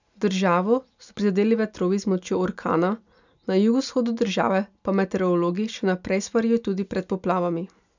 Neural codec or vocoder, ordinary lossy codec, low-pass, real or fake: none; none; 7.2 kHz; real